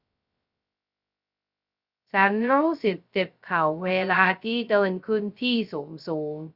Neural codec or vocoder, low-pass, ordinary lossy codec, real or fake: codec, 16 kHz, 0.2 kbps, FocalCodec; 5.4 kHz; Opus, 64 kbps; fake